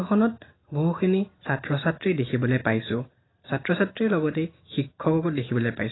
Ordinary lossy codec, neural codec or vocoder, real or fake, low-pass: AAC, 16 kbps; none; real; 7.2 kHz